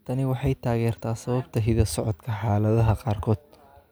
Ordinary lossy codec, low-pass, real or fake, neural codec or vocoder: none; none; real; none